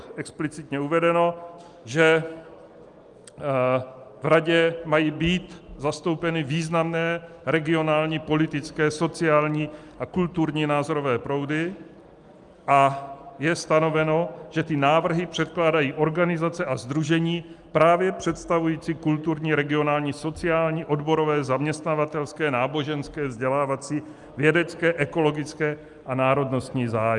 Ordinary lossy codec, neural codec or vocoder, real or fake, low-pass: Opus, 32 kbps; none; real; 10.8 kHz